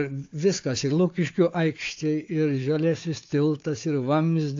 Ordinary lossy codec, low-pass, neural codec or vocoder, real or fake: AAC, 48 kbps; 7.2 kHz; codec, 16 kHz, 4 kbps, FunCodec, trained on Chinese and English, 50 frames a second; fake